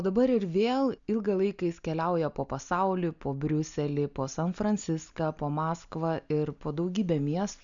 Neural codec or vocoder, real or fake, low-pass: none; real; 7.2 kHz